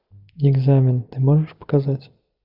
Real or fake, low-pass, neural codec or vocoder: real; 5.4 kHz; none